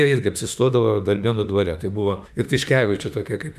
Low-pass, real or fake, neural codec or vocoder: 14.4 kHz; fake; autoencoder, 48 kHz, 32 numbers a frame, DAC-VAE, trained on Japanese speech